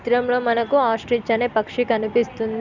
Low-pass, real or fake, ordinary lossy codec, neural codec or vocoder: 7.2 kHz; real; none; none